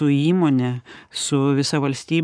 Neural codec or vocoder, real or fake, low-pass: codec, 44.1 kHz, 7.8 kbps, Pupu-Codec; fake; 9.9 kHz